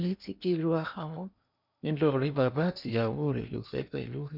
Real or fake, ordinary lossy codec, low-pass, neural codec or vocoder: fake; none; 5.4 kHz; codec, 16 kHz in and 24 kHz out, 0.8 kbps, FocalCodec, streaming, 65536 codes